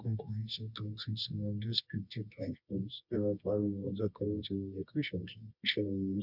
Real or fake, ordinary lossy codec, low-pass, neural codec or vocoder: fake; none; 5.4 kHz; codec, 24 kHz, 0.9 kbps, WavTokenizer, medium music audio release